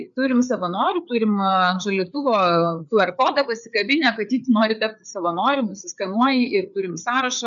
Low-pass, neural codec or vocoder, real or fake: 7.2 kHz; codec, 16 kHz, 4 kbps, FreqCodec, larger model; fake